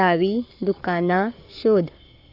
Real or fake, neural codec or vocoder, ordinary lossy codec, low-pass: fake; codec, 44.1 kHz, 7.8 kbps, Pupu-Codec; none; 5.4 kHz